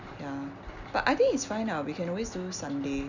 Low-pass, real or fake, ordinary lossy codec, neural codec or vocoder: 7.2 kHz; real; none; none